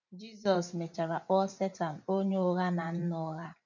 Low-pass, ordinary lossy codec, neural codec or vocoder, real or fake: 7.2 kHz; none; vocoder, 44.1 kHz, 128 mel bands every 512 samples, BigVGAN v2; fake